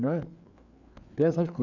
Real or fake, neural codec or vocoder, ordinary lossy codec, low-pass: fake; codec, 16 kHz, 4 kbps, FreqCodec, larger model; none; 7.2 kHz